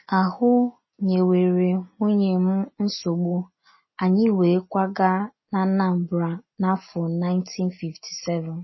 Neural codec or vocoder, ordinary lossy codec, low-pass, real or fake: none; MP3, 24 kbps; 7.2 kHz; real